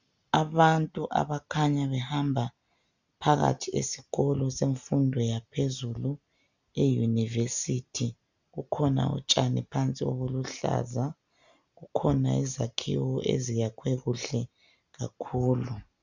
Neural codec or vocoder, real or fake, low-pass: none; real; 7.2 kHz